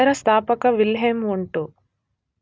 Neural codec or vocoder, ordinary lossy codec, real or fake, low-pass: none; none; real; none